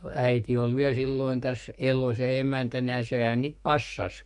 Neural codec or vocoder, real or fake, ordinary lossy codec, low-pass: codec, 44.1 kHz, 2.6 kbps, SNAC; fake; MP3, 64 kbps; 10.8 kHz